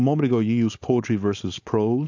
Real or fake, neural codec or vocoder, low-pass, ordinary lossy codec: fake; codec, 16 kHz, 4.8 kbps, FACodec; 7.2 kHz; AAC, 48 kbps